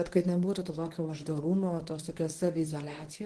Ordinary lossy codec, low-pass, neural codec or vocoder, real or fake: Opus, 16 kbps; 10.8 kHz; codec, 24 kHz, 0.9 kbps, WavTokenizer, medium speech release version 1; fake